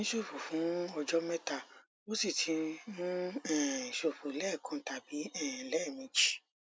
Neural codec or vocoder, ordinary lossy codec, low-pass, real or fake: none; none; none; real